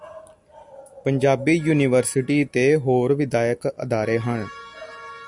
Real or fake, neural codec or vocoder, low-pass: real; none; 10.8 kHz